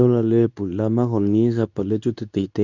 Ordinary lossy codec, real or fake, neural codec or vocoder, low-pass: none; fake; codec, 24 kHz, 0.9 kbps, WavTokenizer, medium speech release version 2; 7.2 kHz